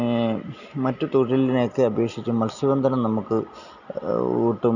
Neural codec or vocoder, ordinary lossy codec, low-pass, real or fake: none; none; 7.2 kHz; real